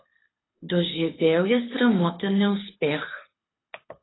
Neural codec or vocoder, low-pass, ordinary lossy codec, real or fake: codec, 24 kHz, 6 kbps, HILCodec; 7.2 kHz; AAC, 16 kbps; fake